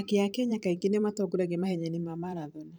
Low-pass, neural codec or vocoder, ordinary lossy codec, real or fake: none; vocoder, 44.1 kHz, 128 mel bands every 512 samples, BigVGAN v2; none; fake